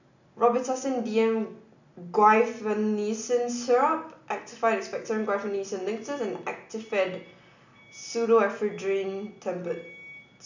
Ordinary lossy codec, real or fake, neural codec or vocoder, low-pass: none; real; none; 7.2 kHz